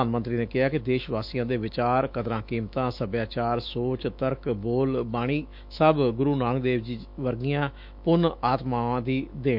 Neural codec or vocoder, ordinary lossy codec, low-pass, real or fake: autoencoder, 48 kHz, 128 numbers a frame, DAC-VAE, trained on Japanese speech; MP3, 48 kbps; 5.4 kHz; fake